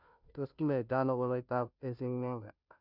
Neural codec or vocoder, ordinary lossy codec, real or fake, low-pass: codec, 16 kHz, 0.5 kbps, FunCodec, trained on Chinese and English, 25 frames a second; none; fake; 5.4 kHz